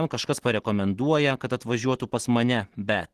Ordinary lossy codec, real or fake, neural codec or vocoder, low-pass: Opus, 16 kbps; real; none; 14.4 kHz